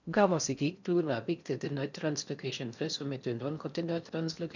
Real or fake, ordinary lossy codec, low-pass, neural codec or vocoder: fake; none; 7.2 kHz; codec, 16 kHz in and 24 kHz out, 0.6 kbps, FocalCodec, streaming, 4096 codes